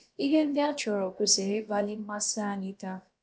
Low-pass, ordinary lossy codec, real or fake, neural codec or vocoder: none; none; fake; codec, 16 kHz, about 1 kbps, DyCAST, with the encoder's durations